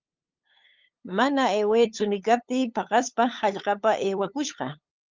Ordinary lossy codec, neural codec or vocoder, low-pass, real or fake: Opus, 32 kbps; codec, 16 kHz, 8 kbps, FunCodec, trained on LibriTTS, 25 frames a second; 7.2 kHz; fake